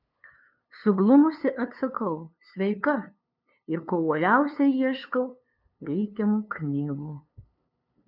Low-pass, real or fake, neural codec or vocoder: 5.4 kHz; fake; codec, 16 kHz, 2 kbps, FunCodec, trained on LibriTTS, 25 frames a second